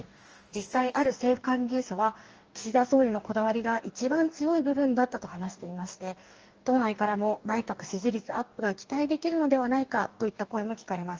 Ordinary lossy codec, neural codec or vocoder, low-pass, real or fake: Opus, 24 kbps; codec, 44.1 kHz, 2.6 kbps, DAC; 7.2 kHz; fake